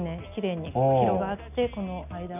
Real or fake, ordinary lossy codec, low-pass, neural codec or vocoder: real; none; 3.6 kHz; none